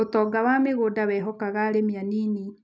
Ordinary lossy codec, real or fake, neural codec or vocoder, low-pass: none; real; none; none